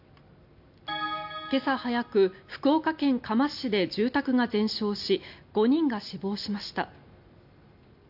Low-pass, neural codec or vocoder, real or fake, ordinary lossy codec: 5.4 kHz; none; real; AAC, 48 kbps